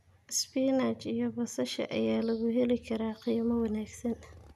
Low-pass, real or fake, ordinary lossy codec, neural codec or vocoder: 14.4 kHz; real; none; none